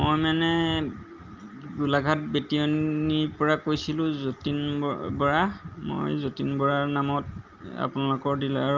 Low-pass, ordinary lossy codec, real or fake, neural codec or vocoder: 7.2 kHz; Opus, 24 kbps; real; none